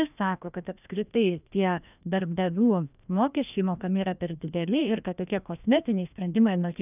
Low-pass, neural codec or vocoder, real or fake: 3.6 kHz; codec, 16 kHz, 1 kbps, FreqCodec, larger model; fake